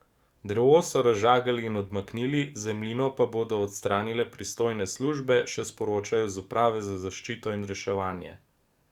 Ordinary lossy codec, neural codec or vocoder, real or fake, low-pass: Opus, 64 kbps; codec, 44.1 kHz, 7.8 kbps, DAC; fake; 19.8 kHz